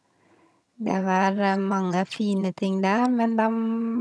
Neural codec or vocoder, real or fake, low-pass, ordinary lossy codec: vocoder, 22.05 kHz, 80 mel bands, HiFi-GAN; fake; none; none